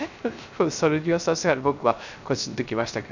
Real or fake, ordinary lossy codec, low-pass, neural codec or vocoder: fake; none; 7.2 kHz; codec, 16 kHz, 0.3 kbps, FocalCodec